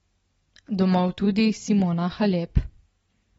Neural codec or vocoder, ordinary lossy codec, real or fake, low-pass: none; AAC, 24 kbps; real; 19.8 kHz